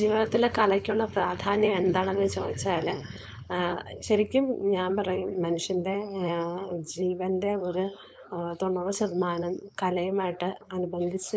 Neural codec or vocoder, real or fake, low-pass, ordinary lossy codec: codec, 16 kHz, 4.8 kbps, FACodec; fake; none; none